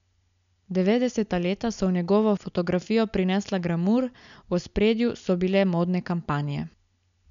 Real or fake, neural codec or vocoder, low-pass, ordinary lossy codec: real; none; 7.2 kHz; none